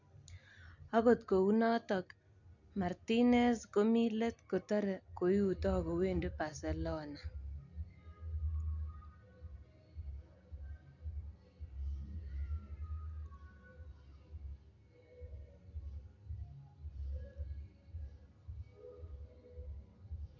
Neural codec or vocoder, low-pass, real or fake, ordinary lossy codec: none; 7.2 kHz; real; none